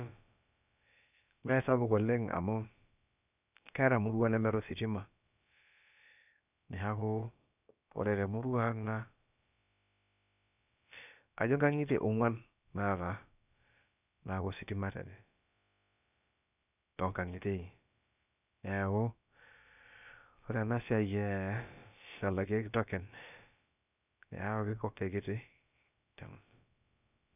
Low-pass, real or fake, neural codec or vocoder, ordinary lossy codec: 3.6 kHz; fake; codec, 16 kHz, about 1 kbps, DyCAST, with the encoder's durations; none